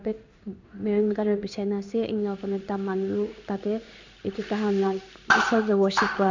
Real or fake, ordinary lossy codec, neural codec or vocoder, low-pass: fake; MP3, 64 kbps; codec, 16 kHz in and 24 kHz out, 1 kbps, XY-Tokenizer; 7.2 kHz